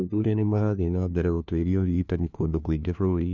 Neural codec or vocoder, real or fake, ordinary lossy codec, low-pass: codec, 16 kHz, 1 kbps, FunCodec, trained on LibriTTS, 50 frames a second; fake; none; 7.2 kHz